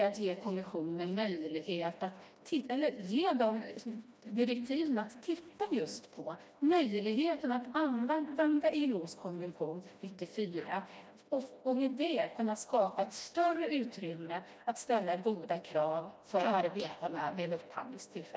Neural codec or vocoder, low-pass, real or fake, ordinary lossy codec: codec, 16 kHz, 1 kbps, FreqCodec, smaller model; none; fake; none